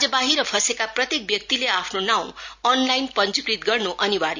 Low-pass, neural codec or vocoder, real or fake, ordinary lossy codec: 7.2 kHz; none; real; none